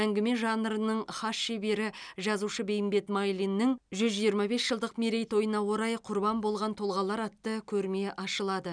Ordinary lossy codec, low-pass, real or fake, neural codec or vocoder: none; 9.9 kHz; real; none